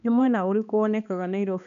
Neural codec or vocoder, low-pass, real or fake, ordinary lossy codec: codec, 16 kHz, 2 kbps, X-Codec, HuBERT features, trained on balanced general audio; 7.2 kHz; fake; none